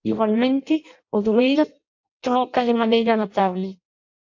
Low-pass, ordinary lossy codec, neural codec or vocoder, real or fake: 7.2 kHz; AAC, 48 kbps; codec, 16 kHz in and 24 kHz out, 0.6 kbps, FireRedTTS-2 codec; fake